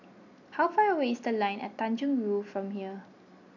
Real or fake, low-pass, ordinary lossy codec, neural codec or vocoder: real; 7.2 kHz; none; none